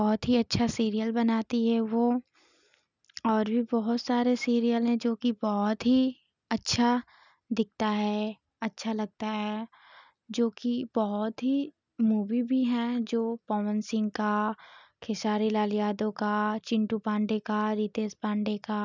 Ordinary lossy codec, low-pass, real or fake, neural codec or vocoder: none; 7.2 kHz; real; none